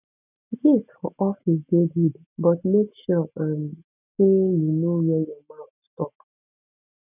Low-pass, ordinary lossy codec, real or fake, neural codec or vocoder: 3.6 kHz; none; real; none